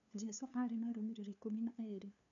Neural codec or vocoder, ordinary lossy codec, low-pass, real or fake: codec, 16 kHz, 2 kbps, FunCodec, trained on LibriTTS, 25 frames a second; none; 7.2 kHz; fake